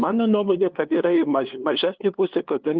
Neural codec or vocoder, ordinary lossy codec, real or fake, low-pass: codec, 16 kHz, 2 kbps, FunCodec, trained on LibriTTS, 25 frames a second; Opus, 24 kbps; fake; 7.2 kHz